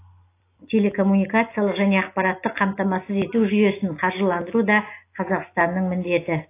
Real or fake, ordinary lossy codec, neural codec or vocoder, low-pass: real; AAC, 24 kbps; none; 3.6 kHz